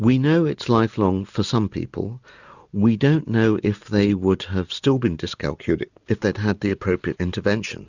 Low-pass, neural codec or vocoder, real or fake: 7.2 kHz; none; real